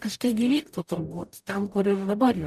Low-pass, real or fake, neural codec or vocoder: 14.4 kHz; fake; codec, 44.1 kHz, 0.9 kbps, DAC